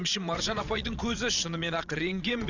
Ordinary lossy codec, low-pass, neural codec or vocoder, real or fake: none; 7.2 kHz; vocoder, 22.05 kHz, 80 mel bands, WaveNeXt; fake